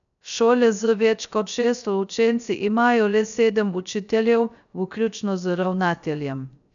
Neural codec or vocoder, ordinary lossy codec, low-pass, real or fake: codec, 16 kHz, 0.3 kbps, FocalCodec; none; 7.2 kHz; fake